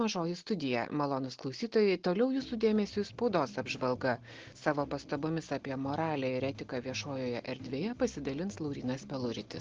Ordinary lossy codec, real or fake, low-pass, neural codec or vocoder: Opus, 16 kbps; real; 7.2 kHz; none